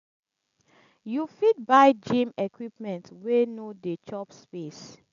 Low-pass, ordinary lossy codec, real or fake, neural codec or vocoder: 7.2 kHz; none; real; none